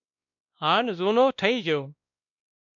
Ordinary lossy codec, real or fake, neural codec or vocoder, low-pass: MP3, 96 kbps; fake; codec, 16 kHz, 1 kbps, X-Codec, WavLM features, trained on Multilingual LibriSpeech; 7.2 kHz